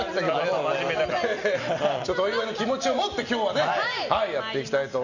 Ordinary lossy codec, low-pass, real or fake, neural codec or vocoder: none; 7.2 kHz; real; none